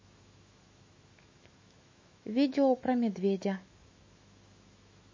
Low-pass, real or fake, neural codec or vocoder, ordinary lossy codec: 7.2 kHz; fake; autoencoder, 48 kHz, 128 numbers a frame, DAC-VAE, trained on Japanese speech; MP3, 32 kbps